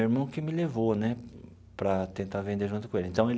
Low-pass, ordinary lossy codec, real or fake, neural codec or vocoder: none; none; real; none